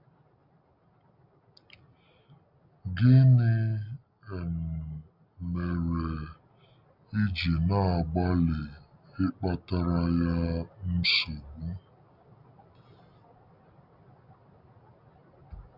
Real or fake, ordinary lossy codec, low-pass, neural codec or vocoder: real; none; 5.4 kHz; none